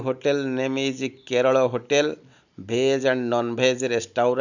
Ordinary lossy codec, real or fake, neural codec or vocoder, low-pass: none; real; none; 7.2 kHz